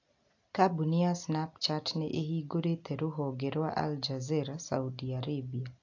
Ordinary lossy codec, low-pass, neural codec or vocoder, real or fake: AAC, 48 kbps; 7.2 kHz; none; real